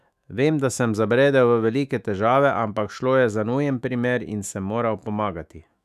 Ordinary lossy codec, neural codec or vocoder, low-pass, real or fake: none; autoencoder, 48 kHz, 128 numbers a frame, DAC-VAE, trained on Japanese speech; 14.4 kHz; fake